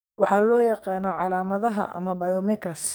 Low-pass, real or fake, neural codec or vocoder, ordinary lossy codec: none; fake; codec, 44.1 kHz, 2.6 kbps, SNAC; none